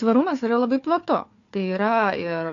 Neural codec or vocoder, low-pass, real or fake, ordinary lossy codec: codec, 16 kHz, 4 kbps, FunCodec, trained on Chinese and English, 50 frames a second; 7.2 kHz; fake; AAC, 48 kbps